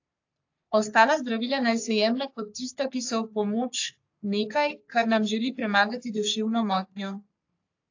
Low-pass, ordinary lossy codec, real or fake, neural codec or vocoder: 7.2 kHz; AAC, 48 kbps; fake; codec, 44.1 kHz, 3.4 kbps, Pupu-Codec